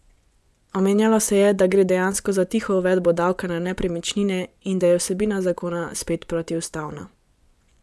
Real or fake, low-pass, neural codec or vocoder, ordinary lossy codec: fake; none; vocoder, 24 kHz, 100 mel bands, Vocos; none